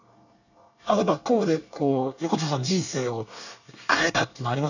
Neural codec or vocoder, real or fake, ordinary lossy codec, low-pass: codec, 24 kHz, 1 kbps, SNAC; fake; none; 7.2 kHz